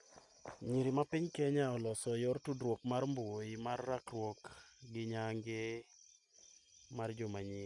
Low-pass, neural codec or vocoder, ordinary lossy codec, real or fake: 10.8 kHz; none; none; real